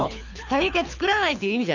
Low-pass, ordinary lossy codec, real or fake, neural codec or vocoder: 7.2 kHz; none; fake; codec, 24 kHz, 6 kbps, HILCodec